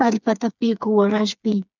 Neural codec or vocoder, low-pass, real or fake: codec, 16 kHz, 4 kbps, FreqCodec, smaller model; 7.2 kHz; fake